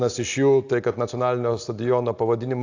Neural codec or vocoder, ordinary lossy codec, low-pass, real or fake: none; AAC, 48 kbps; 7.2 kHz; real